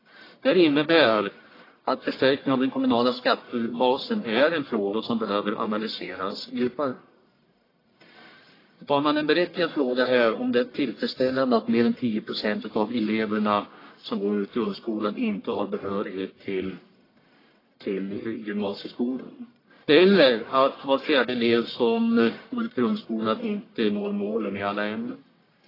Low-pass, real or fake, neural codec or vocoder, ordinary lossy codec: 5.4 kHz; fake; codec, 44.1 kHz, 1.7 kbps, Pupu-Codec; AAC, 24 kbps